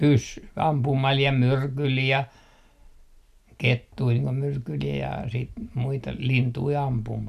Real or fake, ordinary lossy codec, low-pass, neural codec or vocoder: real; none; 14.4 kHz; none